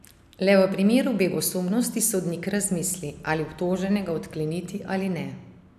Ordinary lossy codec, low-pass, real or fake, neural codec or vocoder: none; 14.4 kHz; real; none